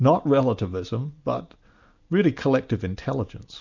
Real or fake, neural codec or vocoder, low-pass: real; none; 7.2 kHz